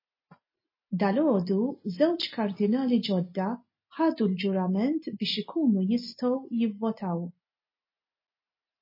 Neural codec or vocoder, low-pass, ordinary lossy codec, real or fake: none; 5.4 kHz; MP3, 24 kbps; real